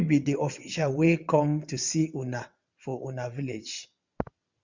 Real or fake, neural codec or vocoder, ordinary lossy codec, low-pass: fake; vocoder, 22.05 kHz, 80 mel bands, WaveNeXt; Opus, 64 kbps; 7.2 kHz